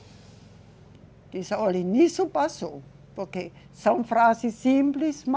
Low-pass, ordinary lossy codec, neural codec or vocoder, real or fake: none; none; none; real